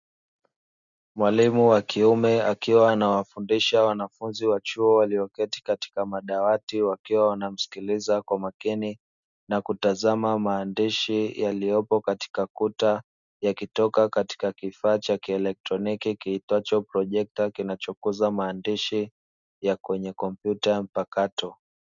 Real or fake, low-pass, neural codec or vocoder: real; 7.2 kHz; none